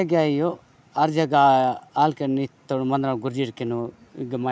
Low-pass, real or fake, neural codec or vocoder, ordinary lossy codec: none; real; none; none